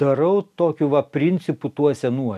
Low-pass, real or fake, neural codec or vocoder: 14.4 kHz; real; none